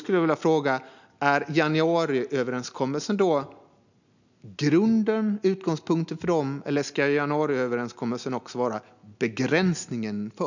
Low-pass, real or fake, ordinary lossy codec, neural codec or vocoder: 7.2 kHz; real; none; none